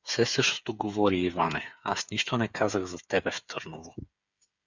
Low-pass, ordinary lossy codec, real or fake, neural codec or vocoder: 7.2 kHz; Opus, 64 kbps; fake; codec, 16 kHz, 8 kbps, FreqCodec, smaller model